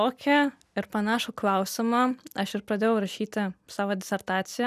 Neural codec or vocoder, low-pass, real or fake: none; 14.4 kHz; real